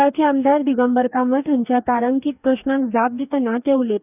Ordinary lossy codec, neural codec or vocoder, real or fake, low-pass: none; codec, 44.1 kHz, 2.6 kbps, DAC; fake; 3.6 kHz